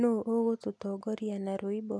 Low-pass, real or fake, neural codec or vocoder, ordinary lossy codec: none; real; none; none